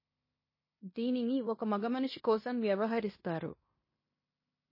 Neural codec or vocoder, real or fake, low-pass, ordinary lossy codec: codec, 16 kHz in and 24 kHz out, 0.9 kbps, LongCat-Audio-Codec, fine tuned four codebook decoder; fake; 5.4 kHz; MP3, 24 kbps